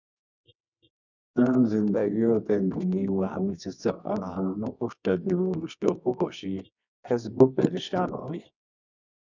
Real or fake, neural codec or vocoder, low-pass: fake; codec, 24 kHz, 0.9 kbps, WavTokenizer, medium music audio release; 7.2 kHz